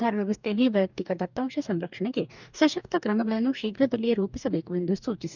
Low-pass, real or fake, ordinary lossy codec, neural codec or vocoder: 7.2 kHz; fake; none; codec, 44.1 kHz, 2.6 kbps, DAC